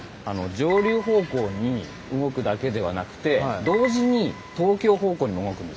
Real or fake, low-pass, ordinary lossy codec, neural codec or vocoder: real; none; none; none